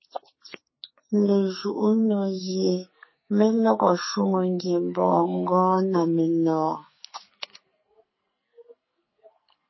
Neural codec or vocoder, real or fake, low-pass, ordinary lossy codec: codec, 44.1 kHz, 2.6 kbps, SNAC; fake; 7.2 kHz; MP3, 24 kbps